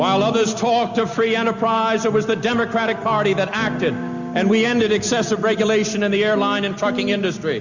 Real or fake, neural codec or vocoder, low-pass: real; none; 7.2 kHz